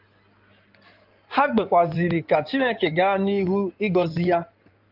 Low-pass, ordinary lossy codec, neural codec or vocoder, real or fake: 5.4 kHz; Opus, 24 kbps; codec, 16 kHz in and 24 kHz out, 2.2 kbps, FireRedTTS-2 codec; fake